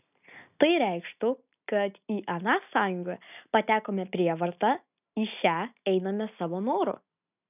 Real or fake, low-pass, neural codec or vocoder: real; 3.6 kHz; none